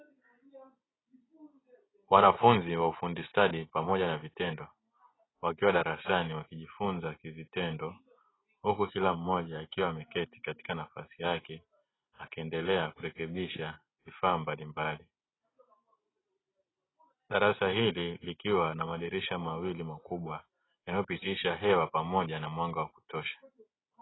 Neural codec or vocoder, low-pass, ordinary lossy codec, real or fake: none; 7.2 kHz; AAC, 16 kbps; real